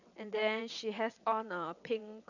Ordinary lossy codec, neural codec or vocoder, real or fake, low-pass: none; vocoder, 22.05 kHz, 80 mel bands, WaveNeXt; fake; 7.2 kHz